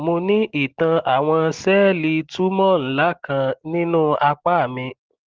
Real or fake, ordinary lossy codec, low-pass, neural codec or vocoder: real; Opus, 16 kbps; 7.2 kHz; none